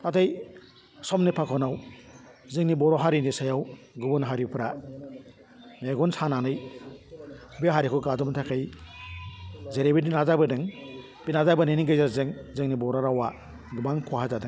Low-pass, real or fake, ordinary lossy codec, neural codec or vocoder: none; real; none; none